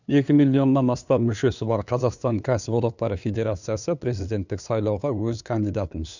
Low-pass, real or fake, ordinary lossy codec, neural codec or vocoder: 7.2 kHz; fake; none; codec, 16 kHz, 2 kbps, FunCodec, trained on LibriTTS, 25 frames a second